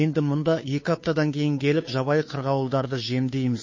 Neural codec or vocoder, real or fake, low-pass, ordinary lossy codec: codec, 16 kHz, 2 kbps, FunCodec, trained on Chinese and English, 25 frames a second; fake; 7.2 kHz; MP3, 32 kbps